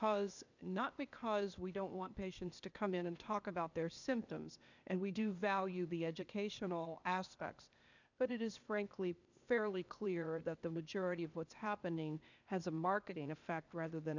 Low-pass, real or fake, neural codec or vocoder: 7.2 kHz; fake; codec, 16 kHz, 0.8 kbps, ZipCodec